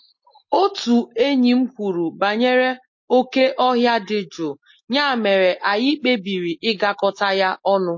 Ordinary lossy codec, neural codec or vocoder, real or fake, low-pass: MP3, 32 kbps; none; real; 7.2 kHz